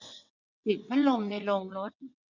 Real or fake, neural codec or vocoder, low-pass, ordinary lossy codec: fake; vocoder, 22.05 kHz, 80 mel bands, WaveNeXt; 7.2 kHz; none